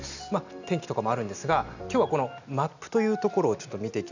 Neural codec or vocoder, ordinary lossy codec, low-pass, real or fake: none; none; 7.2 kHz; real